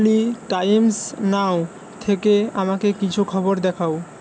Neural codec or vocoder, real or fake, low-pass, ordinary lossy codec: none; real; none; none